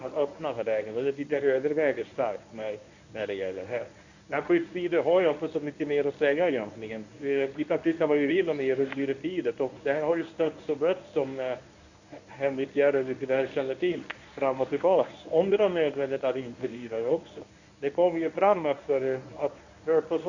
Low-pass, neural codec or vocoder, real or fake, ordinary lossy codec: 7.2 kHz; codec, 24 kHz, 0.9 kbps, WavTokenizer, medium speech release version 1; fake; none